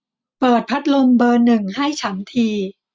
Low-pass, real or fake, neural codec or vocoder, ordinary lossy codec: none; real; none; none